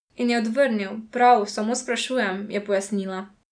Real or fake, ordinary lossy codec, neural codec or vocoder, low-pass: real; none; none; 9.9 kHz